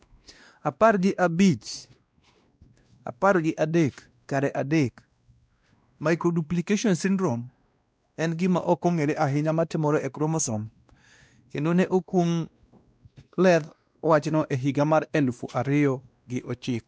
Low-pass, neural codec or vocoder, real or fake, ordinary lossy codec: none; codec, 16 kHz, 1 kbps, X-Codec, WavLM features, trained on Multilingual LibriSpeech; fake; none